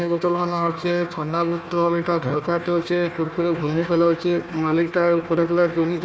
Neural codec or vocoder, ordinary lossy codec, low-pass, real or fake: codec, 16 kHz, 1 kbps, FunCodec, trained on Chinese and English, 50 frames a second; none; none; fake